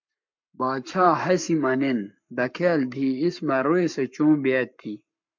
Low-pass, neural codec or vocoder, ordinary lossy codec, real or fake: 7.2 kHz; codec, 44.1 kHz, 7.8 kbps, Pupu-Codec; MP3, 64 kbps; fake